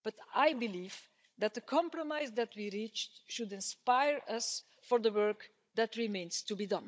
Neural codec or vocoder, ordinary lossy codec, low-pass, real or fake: codec, 16 kHz, 16 kbps, FunCodec, trained on Chinese and English, 50 frames a second; none; none; fake